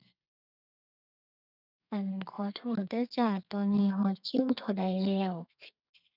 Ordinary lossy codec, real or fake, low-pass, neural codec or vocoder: none; fake; 5.4 kHz; codec, 32 kHz, 1.9 kbps, SNAC